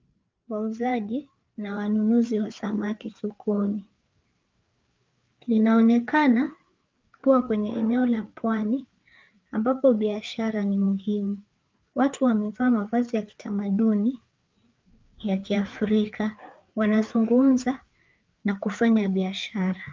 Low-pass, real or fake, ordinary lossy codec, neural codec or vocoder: 7.2 kHz; fake; Opus, 24 kbps; codec, 16 kHz, 4 kbps, FreqCodec, larger model